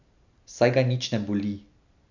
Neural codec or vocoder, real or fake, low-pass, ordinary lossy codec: none; real; 7.2 kHz; none